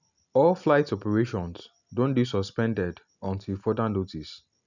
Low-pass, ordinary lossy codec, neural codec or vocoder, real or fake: 7.2 kHz; none; none; real